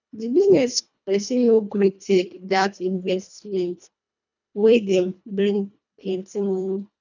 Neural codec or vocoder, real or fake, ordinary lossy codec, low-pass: codec, 24 kHz, 1.5 kbps, HILCodec; fake; none; 7.2 kHz